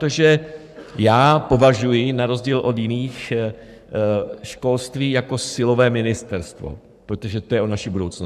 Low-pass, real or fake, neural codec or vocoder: 14.4 kHz; fake; codec, 44.1 kHz, 7.8 kbps, Pupu-Codec